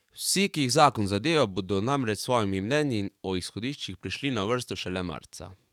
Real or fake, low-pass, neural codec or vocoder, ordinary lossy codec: fake; 19.8 kHz; codec, 44.1 kHz, 7.8 kbps, DAC; none